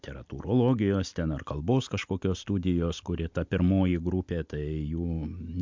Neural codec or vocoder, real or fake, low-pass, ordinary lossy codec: none; real; 7.2 kHz; MP3, 64 kbps